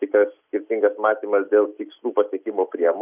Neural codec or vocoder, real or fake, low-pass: none; real; 3.6 kHz